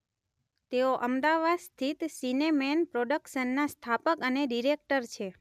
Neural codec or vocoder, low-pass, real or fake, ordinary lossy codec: none; 14.4 kHz; real; none